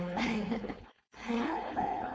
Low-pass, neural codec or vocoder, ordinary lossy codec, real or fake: none; codec, 16 kHz, 4.8 kbps, FACodec; none; fake